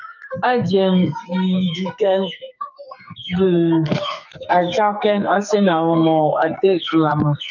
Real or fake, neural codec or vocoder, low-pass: fake; codec, 44.1 kHz, 2.6 kbps, SNAC; 7.2 kHz